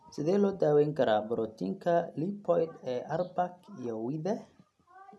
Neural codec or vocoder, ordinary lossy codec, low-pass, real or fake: none; none; none; real